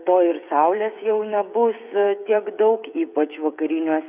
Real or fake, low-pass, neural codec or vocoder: fake; 3.6 kHz; codec, 16 kHz, 8 kbps, FreqCodec, smaller model